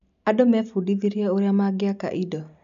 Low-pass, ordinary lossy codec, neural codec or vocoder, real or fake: 7.2 kHz; none; none; real